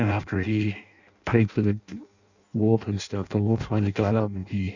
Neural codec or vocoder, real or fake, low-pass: codec, 16 kHz in and 24 kHz out, 0.6 kbps, FireRedTTS-2 codec; fake; 7.2 kHz